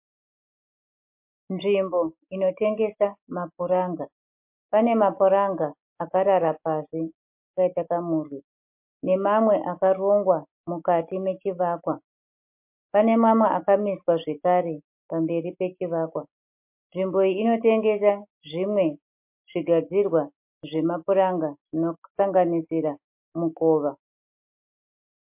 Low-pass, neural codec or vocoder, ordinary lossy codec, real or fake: 3.6 kHz; none; MP3, 32 kbps; real